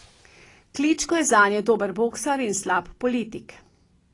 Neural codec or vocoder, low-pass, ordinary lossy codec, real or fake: none; 10.8 kHz; AAC, 32 kbps; real